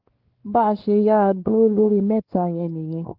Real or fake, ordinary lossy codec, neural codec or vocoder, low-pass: fake; Opus, 16 kbps; codec, 16 kHz, 2 kbps, X-Codec, WavLM features, trained on Multilingual LibriSpeech; 5.4 kHz